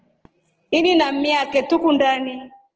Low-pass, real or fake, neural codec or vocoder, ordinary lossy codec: 7.2 kHz; real; none; Opus, 16 kbps